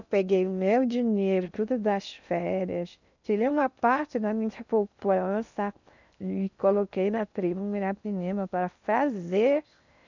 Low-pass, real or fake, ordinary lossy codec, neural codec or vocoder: 7.2 kHz; fake; none; codec, 16 kHz in and 24 kHz out, 0.6 kbps, FocalCodec, streaming, 2048 codes